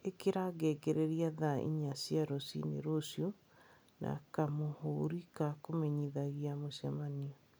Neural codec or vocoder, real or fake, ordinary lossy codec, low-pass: none; real; none; none